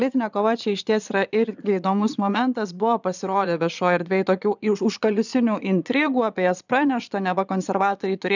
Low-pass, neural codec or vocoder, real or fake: 7.2 kHz; vocoder, 22.05 kHz, 80 mel bands, Vocos; fake